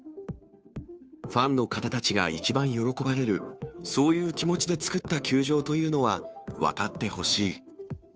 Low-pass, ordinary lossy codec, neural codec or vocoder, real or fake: none; none; codec, 16 kHz, 2 kbps, FunCodec, trained on Chinese and English, 25 frames a second; fake